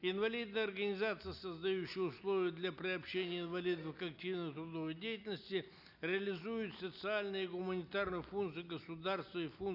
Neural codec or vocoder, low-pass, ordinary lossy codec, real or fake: none; 5.4 kHz; none; real